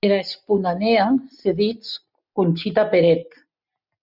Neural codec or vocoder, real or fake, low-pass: none; real; 5.4 kHz